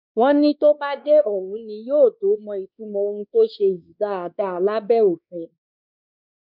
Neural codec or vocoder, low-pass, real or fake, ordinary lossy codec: codec, 16 kHz, 2 kbps, X-Codec, WavLM features, trained on Multilingual LibriSpeech; 5.4 kHz; fake; none